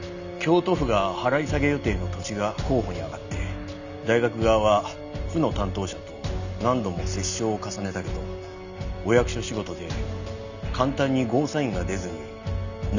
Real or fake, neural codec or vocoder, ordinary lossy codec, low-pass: real; none; none; 7.2 kHz